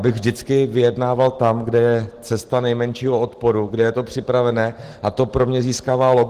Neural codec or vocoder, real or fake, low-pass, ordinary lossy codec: none; real; 14.4 kHz; Opus, 16 kbps